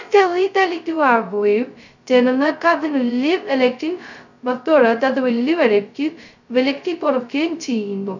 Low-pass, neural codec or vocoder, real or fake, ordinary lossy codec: 7.2 kHz; codec, 16 kHz, 0.2 kbps, FocalCodec; fake; none